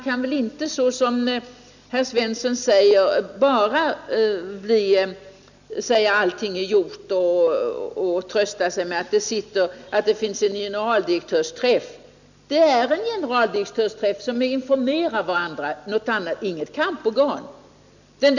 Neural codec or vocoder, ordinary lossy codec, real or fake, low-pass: none; none; real; 7.2 kHz